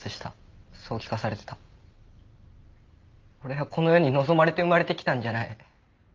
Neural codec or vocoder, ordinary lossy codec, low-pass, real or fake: none; Opus, 24 kbps; 7.2 kHz; real